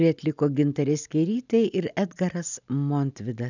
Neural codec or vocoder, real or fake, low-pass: none; real; 7.2 kHz